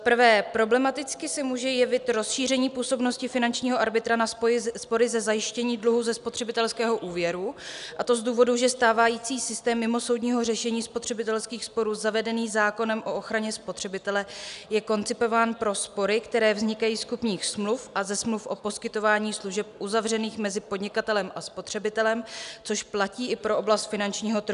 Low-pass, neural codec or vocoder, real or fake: 10.8 kHz; none; real